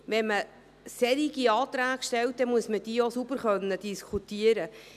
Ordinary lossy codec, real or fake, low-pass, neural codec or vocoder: none; real; 14.4 kHz; none